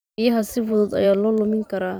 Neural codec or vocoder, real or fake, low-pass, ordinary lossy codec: vocoder, 44.1 kHz, 128 mel bands every 512 samples, BigVGAN v2; fake; none; none